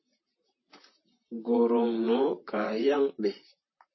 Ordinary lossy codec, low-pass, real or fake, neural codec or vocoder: MP3, 24 kbps; 7.2 kHz; fake; vocoder, 44.1 kHz, 128 mel bands, Pupu-Vocoder